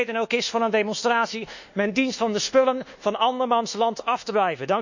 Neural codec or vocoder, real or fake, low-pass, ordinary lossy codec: codec, 24 kHz, 1.2 kbps, DualCodec; fake; 7.2 kHz; none